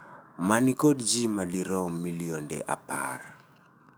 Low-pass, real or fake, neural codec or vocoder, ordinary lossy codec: none; fake; codec, 44.1 kHz, 7.8 kbps, DAC; none